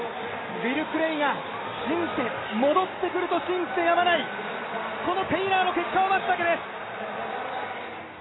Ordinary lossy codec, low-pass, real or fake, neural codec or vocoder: AAC, 16 kbps; 7.2 kHz; real; none